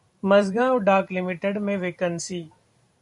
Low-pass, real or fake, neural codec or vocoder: 10.8 kHz; fake; vocoder, 44.1 kHz, 128 mel bands every 512 samples, BigVGAN v2